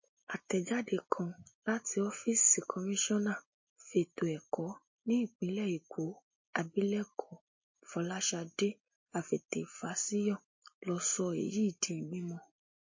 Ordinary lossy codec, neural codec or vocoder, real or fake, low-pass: MP3, 32 kbps; none; real; 7.2 kHz